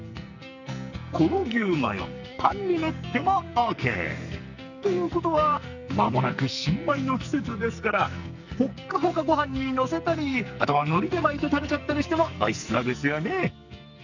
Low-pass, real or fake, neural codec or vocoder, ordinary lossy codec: 7.2 kHz; fake; codec, 44.1 kHz, 2.6 kbps, SNAC; none